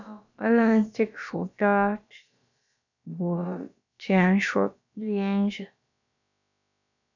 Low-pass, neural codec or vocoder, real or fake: 7.2 kHz; codec, 16 kHz, about 1 kbps, DyCAST, with the encoder's durations; fake